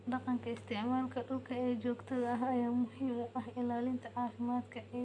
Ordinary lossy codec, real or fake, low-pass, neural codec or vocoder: none; real; 10.8 kHz; none